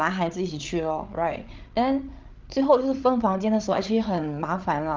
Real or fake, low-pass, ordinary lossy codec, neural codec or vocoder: fake; 7.2 kHz; Opus, 32 kbps; codec, 16 kHz, 8 kbps, FreqCodec, larger model